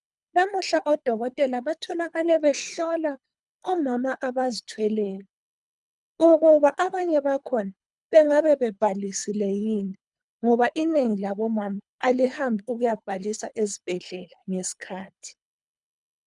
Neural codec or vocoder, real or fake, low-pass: codec, 24 kHz, 3 kbps, HILCodec; fake; 10.8 kHz